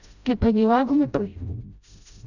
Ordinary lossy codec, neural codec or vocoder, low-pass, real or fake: none; codec, 16 kHz, 0.5 kbps, FreqCodec, smaller model; 7.2 kHz; fake